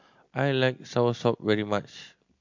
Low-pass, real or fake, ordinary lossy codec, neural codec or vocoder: 7.2 kHz; real; MP3, 48 kbps; none